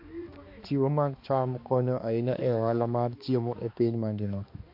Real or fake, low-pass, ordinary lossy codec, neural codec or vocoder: fake; 5.4 kHz; MP3, 32 kbps; codec, 16 kHz, 2 kbps, X-Codec, HuBERT features, trained on balanced general audio